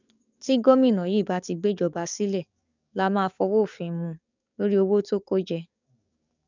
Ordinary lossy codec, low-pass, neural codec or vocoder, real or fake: none; 7.2 kHz; codec, 44.1 kHz, 7.8 kbps, DAC; fake